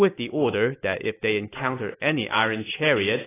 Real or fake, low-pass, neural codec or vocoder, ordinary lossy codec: real; 3.6 kHz; none; AAC, 16 kbps